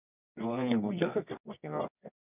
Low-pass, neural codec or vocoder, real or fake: 3.6 kHz; codec, 24 kHz, 0.9 kbps, WavTokenizer, medium music audio release; fake